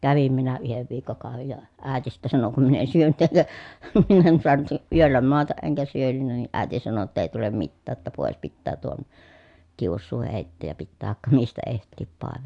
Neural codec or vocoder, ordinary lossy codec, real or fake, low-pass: none; AAC, 64 kbps; real; 10.8 kHz